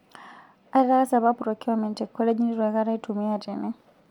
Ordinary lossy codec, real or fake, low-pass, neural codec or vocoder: MP3, 96 kbps; real; 19.8 kHz; none